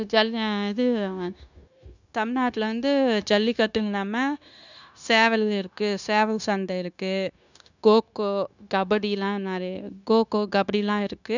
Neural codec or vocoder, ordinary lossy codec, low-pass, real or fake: codec, 16 kHz, 0.9 kbps, LongCat-Audio-Codec; none; 7.2 kHz; fake